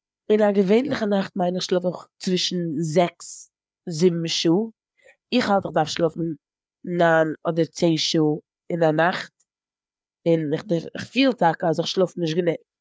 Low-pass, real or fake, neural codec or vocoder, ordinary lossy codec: none; fake; codec, 16 kHz, 4 kbps, FreqCodec, larger model; none